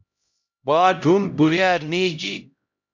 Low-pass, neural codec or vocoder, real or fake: 7.2 kHz; codec, 16 kHz, 0.5 kbps, X-Codec, HuBERT features, trained on LibriSpeech; fake